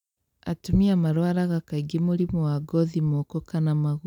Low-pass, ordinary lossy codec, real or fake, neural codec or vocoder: 19.8 kHz; none; real; none